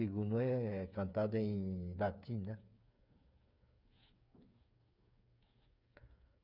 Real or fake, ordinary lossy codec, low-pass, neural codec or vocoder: fake; none; 5.4 kHz; codec, 16 kHz, 8 kbps, FreqCodec, smaller model